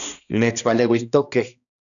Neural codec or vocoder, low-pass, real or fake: codec, 16 kHz, 1 kbps, X-Codec, HuBERT features, trained on balanced general audio; 7.2 kHz; fake